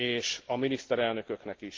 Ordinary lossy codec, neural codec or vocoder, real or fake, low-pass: Opus, 16 kbps; none; real; 7.2 kHz